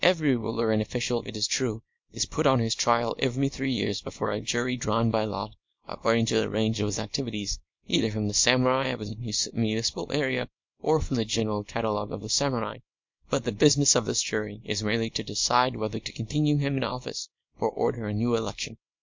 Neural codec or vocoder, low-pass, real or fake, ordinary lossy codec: codec, 24 kHz, 0.9 kbps, WavTokenizer, small release; 7.2 kHz; fake; MP3, 48 kbps